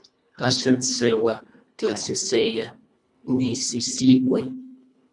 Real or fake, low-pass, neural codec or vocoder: fake; 10.8 kHz; codec, 24 kHz, 1.5 kbps, HILCodec